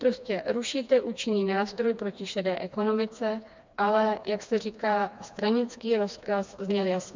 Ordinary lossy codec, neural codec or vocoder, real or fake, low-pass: MP3, 64 kbps; codec, 16 kHz, 2 kbps, FreqCodec, smaller model; fake; 7.2 kHz